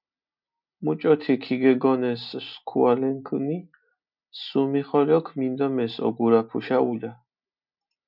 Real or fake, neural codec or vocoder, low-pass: real; none; 5.4 kHz